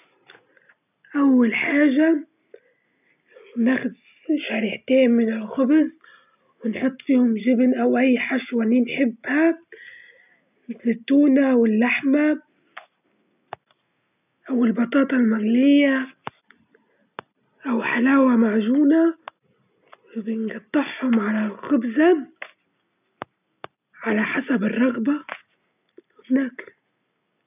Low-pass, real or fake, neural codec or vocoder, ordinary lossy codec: 3.6 kHz; real; none; none